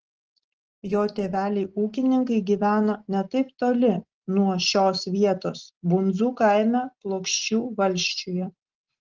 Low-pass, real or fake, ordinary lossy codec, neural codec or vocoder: 7.2 kHz; real; Opus, 16 kbps; none